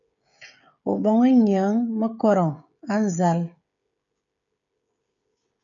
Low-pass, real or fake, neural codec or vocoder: 7.2 kHz; fake; codec, 16 kHz, 16 kbps, FreqCodec, smaller model